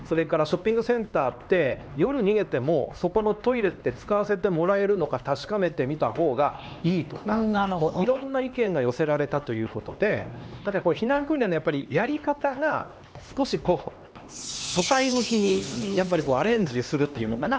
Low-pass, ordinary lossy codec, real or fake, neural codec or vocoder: none; none; fake; codec, 16 kHz, 2 kbps, X-Codec, HuBERT features, trained on LibriSpeech